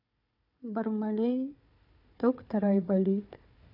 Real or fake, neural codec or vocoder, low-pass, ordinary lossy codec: fake; codec, 16 kHz, 4 kbps, FunCodec, trained on Chinese and English, 50 frames a second; 5.4 kHz; none